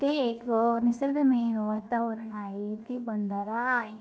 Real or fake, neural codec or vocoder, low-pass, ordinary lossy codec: fake; codec, 16 kHz, 0.7 kbps, FocalCodec; none; none